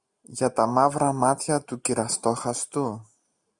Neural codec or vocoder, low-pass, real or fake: none; 10.8 kHz; real